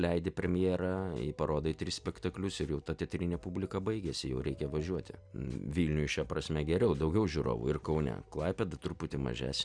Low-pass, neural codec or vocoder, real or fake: 9.9 kHz; none; real